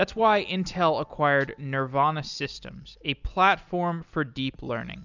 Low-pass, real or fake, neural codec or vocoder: 7.2 kHz; real; none